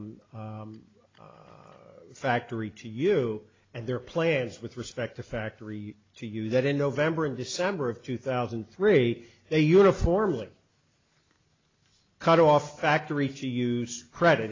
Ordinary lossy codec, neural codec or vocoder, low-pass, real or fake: AAC, 32 kbps; none; 7.2 kHz; real